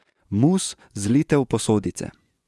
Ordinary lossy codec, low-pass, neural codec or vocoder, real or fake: none; none; vocoder, 24 kHz, 100 mel bands, Vocos; fake